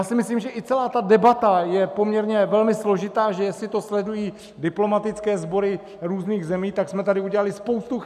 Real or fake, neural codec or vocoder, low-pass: real; none; 14.4 kHz